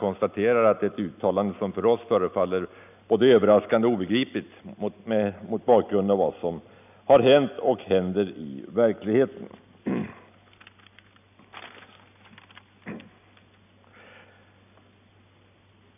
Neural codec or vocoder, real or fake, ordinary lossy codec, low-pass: none; real; none; 3.6 kHz